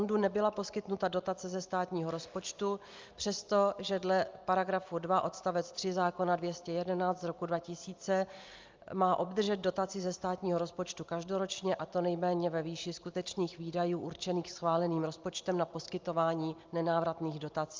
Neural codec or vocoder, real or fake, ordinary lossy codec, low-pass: none; real; Opus, 24 kbps; 7.2 kHz